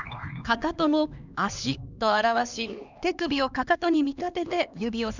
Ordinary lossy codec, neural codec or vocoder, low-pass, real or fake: none; codec, 16 kHz, 2 kbps, X-Codec, HuBERT features, trained on LibriSpeech; 7.2 kHz; fake